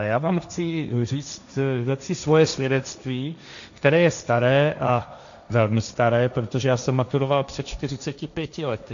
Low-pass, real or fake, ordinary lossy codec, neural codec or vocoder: 7.2 kHz; fake; AAC, 96 kbps; codec, 16 kHz, 1.1 kbps, Voila-Tokenizer